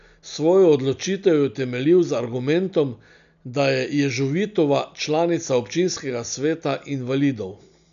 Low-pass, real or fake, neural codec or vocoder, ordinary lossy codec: 7.2 kHz; real; none; none